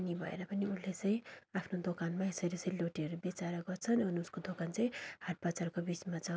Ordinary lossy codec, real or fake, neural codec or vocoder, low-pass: none; real; none; none